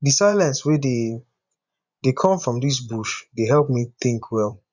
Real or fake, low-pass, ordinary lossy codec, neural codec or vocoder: fake; 7.2 kHz; none; vocoder, 24 kHz, 100 mel bands, Vocos